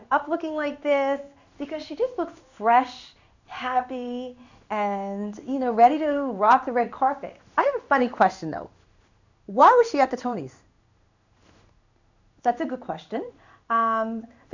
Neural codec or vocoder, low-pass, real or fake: codec, 16 kHz in and 24 kHz out, 1 kbps, XY-Tokenizer; 7.2 kHz; fake